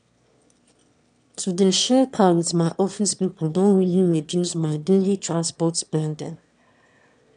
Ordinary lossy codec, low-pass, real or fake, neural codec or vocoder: none; 9.9 kHz; fake; autoencoder, 22.05 kHz, a latent of 192 numbers a frame, VITS, trained on one speaker